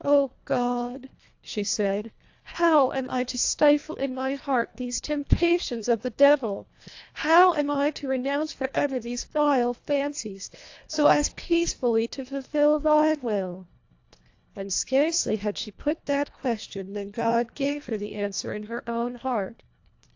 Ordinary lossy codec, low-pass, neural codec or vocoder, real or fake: AAC, 48 kbps; 7.2 kHz; codec, 24 kHz, 1.5 kbps, HILCodec; fake